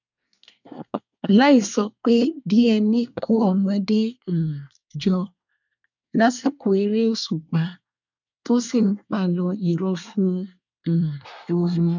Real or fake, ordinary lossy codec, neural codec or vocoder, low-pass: fake; none; codec, 24 kHz, 1 kbps, SNAC; 7.2 kHz